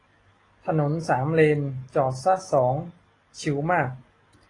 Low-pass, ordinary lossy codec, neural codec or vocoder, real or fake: 10.8 kHz; AAC, 32 kbps; none; real